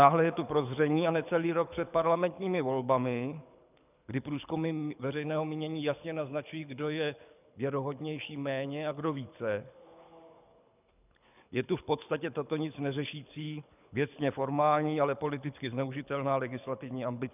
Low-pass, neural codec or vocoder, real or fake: 3.6 kHz; codec, 24 kHz, 6 kbps, HILCodec; fake